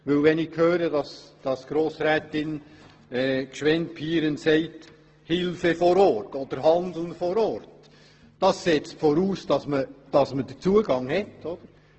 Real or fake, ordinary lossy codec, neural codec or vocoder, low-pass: real; Opus, 16 kbps; none; 7.2 kHz